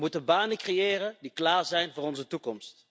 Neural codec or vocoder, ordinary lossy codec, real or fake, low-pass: none; none; real; none